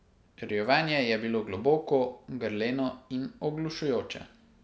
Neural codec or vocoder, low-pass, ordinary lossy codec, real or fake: none; none; none; real